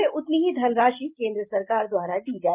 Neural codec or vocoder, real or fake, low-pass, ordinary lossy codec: none; real; 3.6 kHz; Opus, 24 kbps